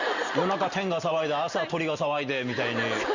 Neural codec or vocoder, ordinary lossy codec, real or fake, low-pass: none; Opus, 64 kbps; real; 7.2 kHz